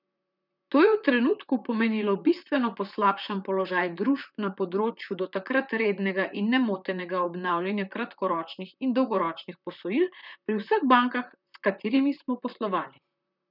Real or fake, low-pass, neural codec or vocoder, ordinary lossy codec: fake; 5.4 kHz; vocoder, 44.1 kHz, 128 mel bands, Pupu-Vocoder; none